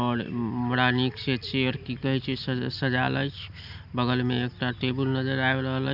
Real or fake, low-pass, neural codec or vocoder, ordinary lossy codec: real; 5.4 kHz; none; none